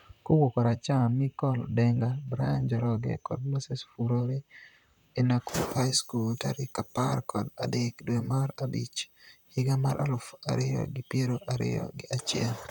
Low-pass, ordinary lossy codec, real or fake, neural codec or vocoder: none; none; fake; vocoder, 44.1 kHz, 128 mel bands, Pupu-Vocoder